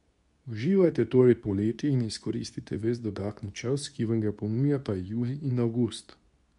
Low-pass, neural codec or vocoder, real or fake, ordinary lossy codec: 10.8 kHz; codec, 24 kHz, 0.9 kbps, WavTokenizer, medium speech release version 2; fake; none